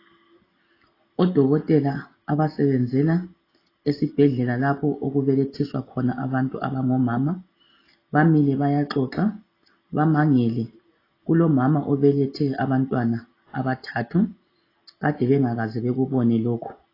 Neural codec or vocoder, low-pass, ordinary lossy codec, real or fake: none; 5.4 kHz; AAC, 24 kbps; real